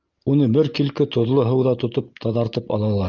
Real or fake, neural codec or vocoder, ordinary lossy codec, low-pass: real; none; Opus, 32 kbps; 7.2 kHz